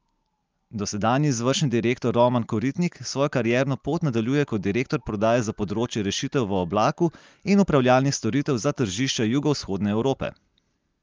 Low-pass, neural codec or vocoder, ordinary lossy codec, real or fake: 7.2 kHz; none; Opus, 24 kbps; real